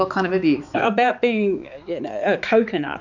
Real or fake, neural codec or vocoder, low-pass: fake; codec, 16 kHz, 4 kbps, X-Codec, HuBERT features, trained on balanced general audio; 7.2 kHz